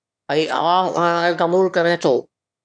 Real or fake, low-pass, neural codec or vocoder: fake; 9.9 kHz; autoencoder, 22.05 kHz, a latent of 192 numbers a frame, VITS, trained on one speaker